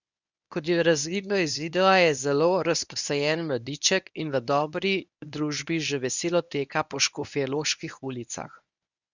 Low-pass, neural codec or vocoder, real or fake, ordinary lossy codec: 7.2 kHz; codec, 24 kHz, 0.9 kbps, WavTokenizer, medium speech release version 1; fake; none